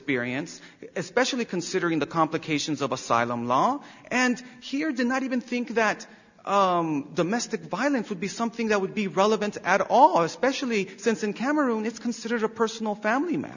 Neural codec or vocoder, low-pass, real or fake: none; 7.2 kHz; real